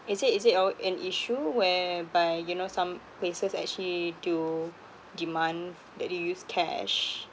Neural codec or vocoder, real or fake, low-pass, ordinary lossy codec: none; real; none; none